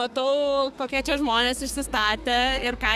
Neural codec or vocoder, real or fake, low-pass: codec, 32 kHz, 1.9 kbps, SNAC; fake; 14.4 kHz